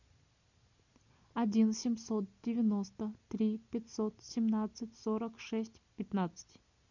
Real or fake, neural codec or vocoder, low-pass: real; none; 7.2 kHz